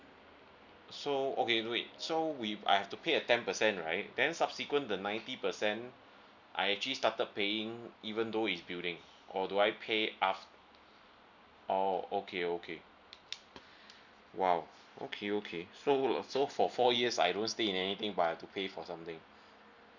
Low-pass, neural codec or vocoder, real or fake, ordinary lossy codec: 7.2 kHz; none; real; none